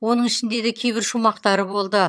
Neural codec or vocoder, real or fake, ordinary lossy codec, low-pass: vocoder, 22.05 kHz, 80 mel bands, HiFi-GAN; fake; none; none